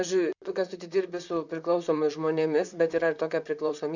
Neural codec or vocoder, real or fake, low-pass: vocoder, 44.1 kHz, 128 mel bands, Pupu-Vocoder; fake; 7.2 kHz